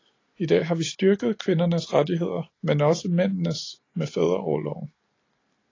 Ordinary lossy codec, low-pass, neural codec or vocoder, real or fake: AAC, 32 kbps; 7.2 kHz; none; real